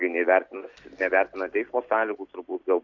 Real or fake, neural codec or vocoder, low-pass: real; none; 7.2 kHz